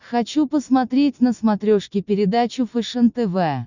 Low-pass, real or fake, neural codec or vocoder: 7.2 kHz; real; none